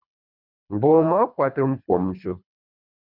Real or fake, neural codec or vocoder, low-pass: fake; codec, 24 kHz, 3 kbps, HILCodec; 5.4 kHz